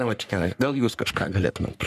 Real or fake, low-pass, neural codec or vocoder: fake; 14.4 kHz; codec, 44.1 kHz, 3.4 kbps, Pupu-Codec